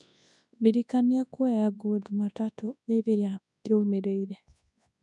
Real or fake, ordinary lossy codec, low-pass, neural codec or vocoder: fake; none; 10.8 kHz; codec, 24 kHz, 0.9 kbps, WavTokenizer, large speech release